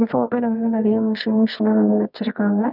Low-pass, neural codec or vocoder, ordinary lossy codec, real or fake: 5.4 kHz; codec, 24 kHz, 0.9 kbps, WavTokenizer, medium music audio release; none; fake